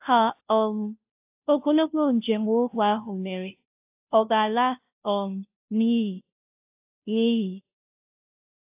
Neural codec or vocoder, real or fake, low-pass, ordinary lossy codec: codec, 16 kHz, 0.5 kbps, FunCodec, trained on Chinese and English, 25 frames a second; fake; 3.6 kHz; AAC, 32 kbps